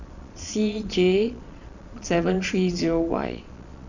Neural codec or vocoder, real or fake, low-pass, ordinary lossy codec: vocoder, 22.05 kHz, 80 mel bands, Vocos; fake; 7.2 kHz; none